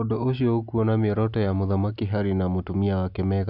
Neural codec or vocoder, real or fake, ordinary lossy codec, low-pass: none; real; none; 5.4 kHz